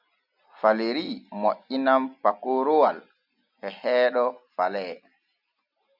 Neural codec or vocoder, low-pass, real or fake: none; 5.4 kHz; real